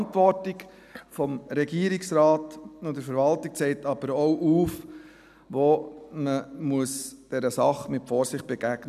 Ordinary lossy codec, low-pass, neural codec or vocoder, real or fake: none; 14.4 kHz; none; real